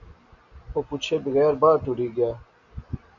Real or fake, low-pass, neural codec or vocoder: real; 7.2 kHz; none